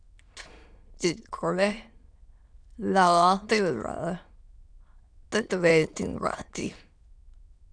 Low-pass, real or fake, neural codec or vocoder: 9.9 kHz; fake; autoencoder, 22.05 kHz, a latent of 192 numbers a frame, VITS, trained on many speakers